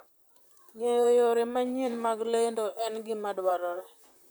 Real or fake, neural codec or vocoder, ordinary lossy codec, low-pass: fake; vocoder, 44.1 kHz, 128 mel bands, Pupu-Vocoder; none; none